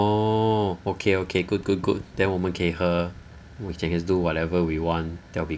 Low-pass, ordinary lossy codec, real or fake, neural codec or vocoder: none; none; real; none